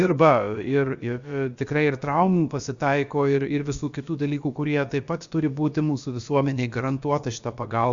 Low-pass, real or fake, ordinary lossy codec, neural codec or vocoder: 7.2 kHz; fake; Opus, 64 kbps; codec, 16 kHz, about 1 kbps, DyCAST, with the encoder's durations